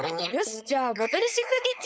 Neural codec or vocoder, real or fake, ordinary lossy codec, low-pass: codec, 16 kHz, 4.8 kbps, FACodec; fake; none; none